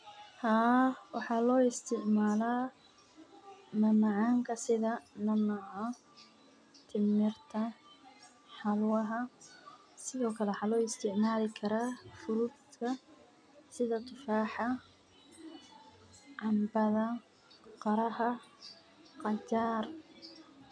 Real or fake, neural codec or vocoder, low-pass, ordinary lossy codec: real; none; 9.9 kHz; none